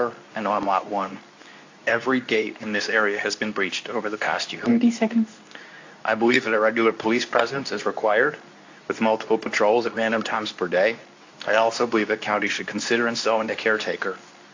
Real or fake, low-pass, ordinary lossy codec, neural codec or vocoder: fake; 7.2 kHz; AAC, 48 kbps; codec, 24 kHz, 0.9 kbps, WavTokenizer, medium speech release version 2